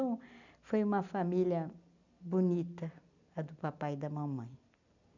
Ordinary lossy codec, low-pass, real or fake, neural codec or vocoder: none; 7.2 kHz; real; none